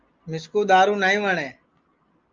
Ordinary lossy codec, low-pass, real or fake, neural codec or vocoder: Opus, 24 kbps; 7.2 kHz; real; none